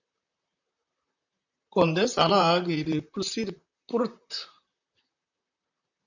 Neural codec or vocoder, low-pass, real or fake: vocoder, 44.1 kHz, 128 mel bands, Pupu-Vocoder; 7.2 kHz; fake